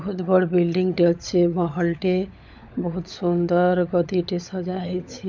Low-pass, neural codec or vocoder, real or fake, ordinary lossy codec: none; codec, 16 kHz, 16 kbps, FunCodec, trained on LibriTTS, 50 frames a second; fake; none